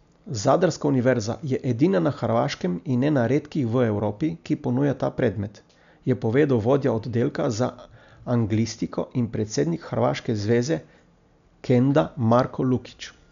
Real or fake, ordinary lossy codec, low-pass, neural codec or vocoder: real; none; 7.2 kHz; none